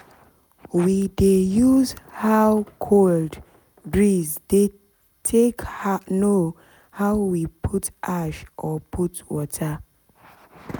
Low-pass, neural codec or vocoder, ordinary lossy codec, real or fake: none; none; none; real